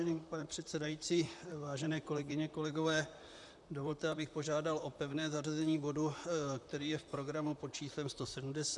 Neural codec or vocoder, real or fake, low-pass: vocoder, 44.1 kHz, 128 mel bands, Pupu-Vocoder; fake; 10.8 kHz